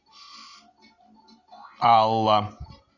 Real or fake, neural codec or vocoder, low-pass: real; none; 7.2 kHz